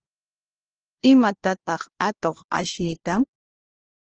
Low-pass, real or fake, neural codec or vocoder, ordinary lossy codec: 7.2 kHz; fake; codec, 16 kHz, 1 kbps, FunCodec, trained on LibriTTS, 50 frames a second; Opus, 16 kbps